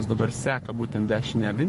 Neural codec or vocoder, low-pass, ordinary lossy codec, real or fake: codec, 44.1 kHz, 3.4 kbps, Pupu-Codec; 14.4 kHz; MP3, 48 kbps; fake